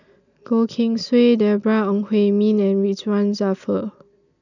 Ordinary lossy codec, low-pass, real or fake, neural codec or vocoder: none; 7.2 kHz; real; none